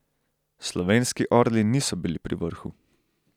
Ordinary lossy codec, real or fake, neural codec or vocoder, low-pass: none; real; none; 19.8 kHz